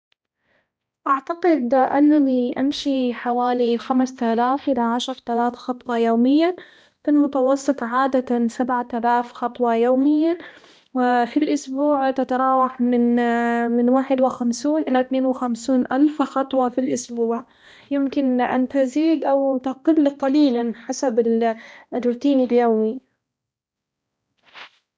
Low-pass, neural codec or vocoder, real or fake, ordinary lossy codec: none; codec, 16 kHz, 1 kbps, X-Codec, HuBERT features, trained on balanced general audio; fake; none